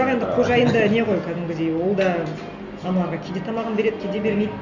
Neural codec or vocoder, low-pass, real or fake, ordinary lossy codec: none; 7.2 kHz; real; none